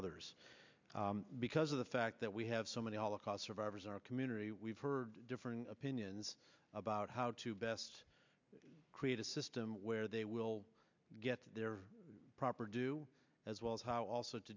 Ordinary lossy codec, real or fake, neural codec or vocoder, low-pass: MP3, 64 kbps; real; none; 7.2 kHz